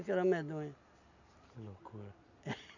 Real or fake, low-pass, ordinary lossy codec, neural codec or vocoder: real; 7.2 kHz; none; none